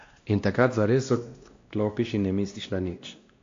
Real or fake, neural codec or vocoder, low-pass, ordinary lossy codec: fake; codec, 16 kHz, 1 kbps, X-Codec, WavLM features, trained on Multilingual LibriSpeech; 7.2 kHz; AAC, 48 kbps